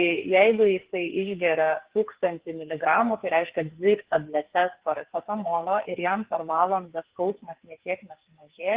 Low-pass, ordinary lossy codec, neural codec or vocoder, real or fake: 3.6 kHz; Opus, 16 kbps; codec, 44.1 kHz, 2.6 kbps, SNAC; fake